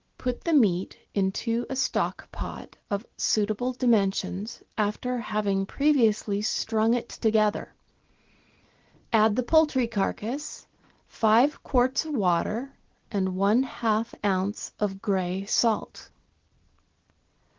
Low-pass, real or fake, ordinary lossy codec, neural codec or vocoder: 7.2 kHz; real; Opus, 16 kbps; none